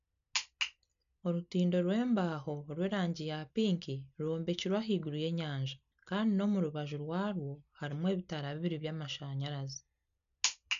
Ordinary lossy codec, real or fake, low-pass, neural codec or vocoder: none; real; 7.2 kHz; none